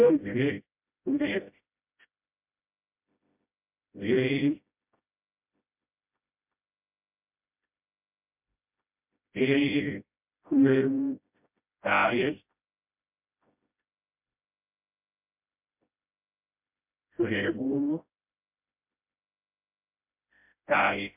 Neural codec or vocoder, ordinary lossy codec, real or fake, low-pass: codec, 16 kHz, 0.5 kbps, FreqCodec, smaller model; MP3, 32 kbps; fake; 3.6 kHz